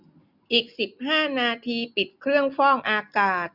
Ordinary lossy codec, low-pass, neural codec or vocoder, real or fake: none; 5.4 kHz; none; real